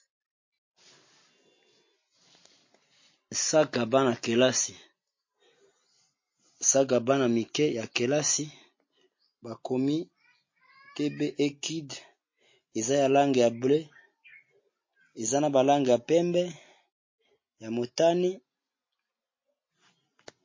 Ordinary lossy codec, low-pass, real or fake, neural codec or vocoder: MP3, 32 kbps; 7.2 kHz; real; none